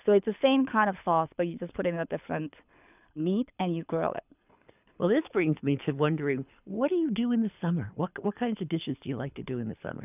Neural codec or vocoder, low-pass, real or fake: codec, 24 kHz, 6 kbps, HILCodec; 3.6 kHz; fake